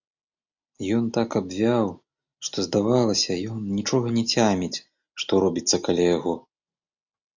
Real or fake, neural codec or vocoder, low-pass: real; none; 7.2 kHz